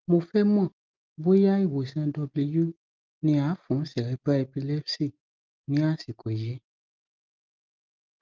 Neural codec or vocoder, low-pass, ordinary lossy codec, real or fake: none; 7.2 kHz; Opus, 24 kbps; real